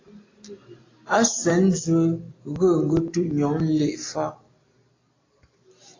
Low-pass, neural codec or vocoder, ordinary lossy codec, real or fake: 7.2 kHz; none; AAC, 32 kbps; real